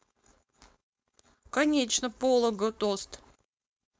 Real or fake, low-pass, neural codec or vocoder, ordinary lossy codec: fake; none; codec, 16 kHz, 4.8 kbps, FACodec; none